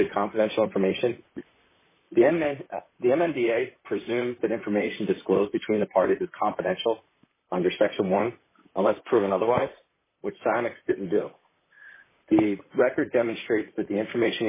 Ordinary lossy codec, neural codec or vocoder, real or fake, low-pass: MP3, 16 kbps; vocoder, 44.1 kHz, 128 mel bands, Pupu-Vocoder; fake; 3.6 kHz